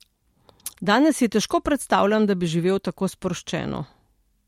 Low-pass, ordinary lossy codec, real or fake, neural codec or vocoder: 19.8 kHz; MP3, 64 kbps; real; none